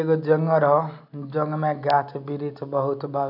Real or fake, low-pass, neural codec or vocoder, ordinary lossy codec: real; 5.4 kHz; none; none